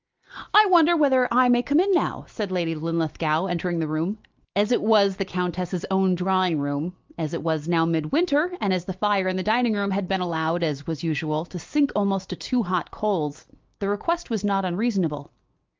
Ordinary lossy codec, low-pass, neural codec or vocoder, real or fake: Opus, 32 kbps; 7.2 kHz; none; real